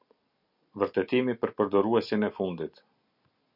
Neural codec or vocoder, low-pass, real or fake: none; 5.4 kHz; real